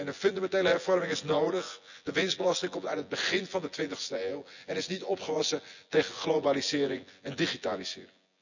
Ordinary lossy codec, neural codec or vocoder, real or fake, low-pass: none; vocoder, 24 kHz, 100 mel bands, Vocos; fake; 7.2 kHz